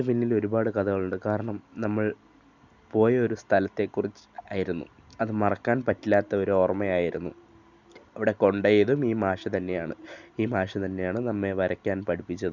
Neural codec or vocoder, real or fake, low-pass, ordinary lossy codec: none; real; 7.2 kHz; none